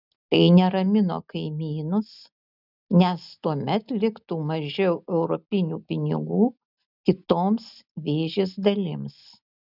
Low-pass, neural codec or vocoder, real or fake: 5.4 kHz; none; real